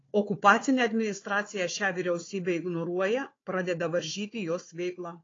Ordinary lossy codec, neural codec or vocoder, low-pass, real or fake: AAC, 32 kbps; codec, 16 kHz, 4 kbps, FunCodec, trained on Chinese and English, 50 frames a second; 7.2 kHz; fake